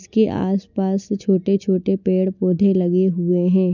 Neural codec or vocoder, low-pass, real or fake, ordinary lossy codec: none; 7.2 kHz; real; none